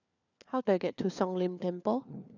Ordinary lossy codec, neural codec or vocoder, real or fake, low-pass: none; codec, 16 kHz, 4 kbps, FunCodec, trained on LibriTTS, 50 frames a second; fake; 7.2 kHz